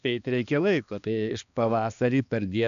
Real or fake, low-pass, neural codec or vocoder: fake; 7.2 kHz; codec, 16 kHz, 2 kbps, X-Codec, HuBERT features, trained on balanced general audio